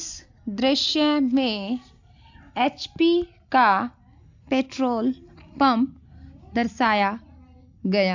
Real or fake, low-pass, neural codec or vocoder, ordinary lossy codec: real; 7.2 kHz; none; AAC, 48 kbps